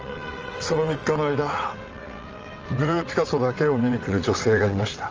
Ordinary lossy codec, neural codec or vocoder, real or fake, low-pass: Opus, 24 kbps; vocoder, 22.05 kHz, 80 mel bands, WaveNeXt; fake; 7.2 kHz